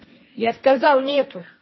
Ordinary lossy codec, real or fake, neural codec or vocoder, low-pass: MP3, 24 kbps; fake; codec, 16 kHz, 1.1 kbps, Voila-Tokenizer; 7.2 kHz